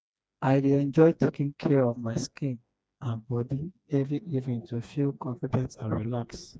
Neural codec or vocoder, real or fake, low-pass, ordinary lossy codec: codec, 16 kHz, 2 kbps, FreqCodec, smaller model; fake; none; none